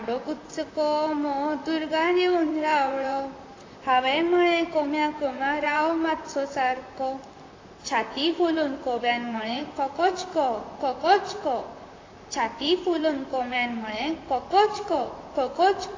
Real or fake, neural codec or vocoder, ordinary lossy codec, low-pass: fake; vocoder, 44.1 kHz, 128 mel bands, Pupu-Vocoder; AAC, 32 kbps; 7.2 kHz